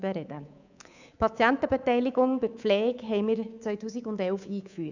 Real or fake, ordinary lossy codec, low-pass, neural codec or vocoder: fake; none; 7.2 kHz; codec, 24 kHz, 3.1 kbps, DualCodec